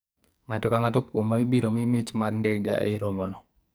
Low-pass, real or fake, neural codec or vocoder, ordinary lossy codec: none; fake; codec, 44.1 kHz, 2.6 kbps, SNAC; none